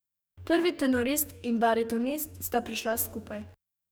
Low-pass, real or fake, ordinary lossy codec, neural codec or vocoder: none; fake; none; codec, 44.1 kHz, 2.6 kbps, DAC